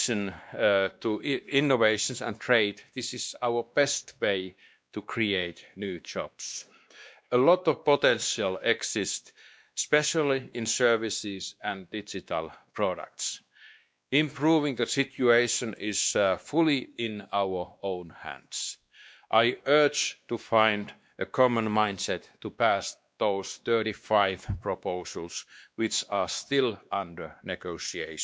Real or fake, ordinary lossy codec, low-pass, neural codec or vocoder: fake; none; none; codec, 16 kHz, 2 kbps, X-Codec, WavLM features, trained on Multilingual LibriSpeech